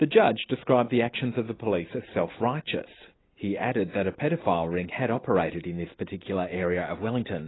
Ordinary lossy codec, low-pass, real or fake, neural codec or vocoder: AAC, 16 kbps; 7.2 kHz; real; none